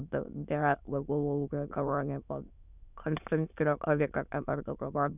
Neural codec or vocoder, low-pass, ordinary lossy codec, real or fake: autoencoder, 22.05 kHz, a latent of 192 numbers a frame, VITS, trained on many speakers; 3.6 kHz; none; fake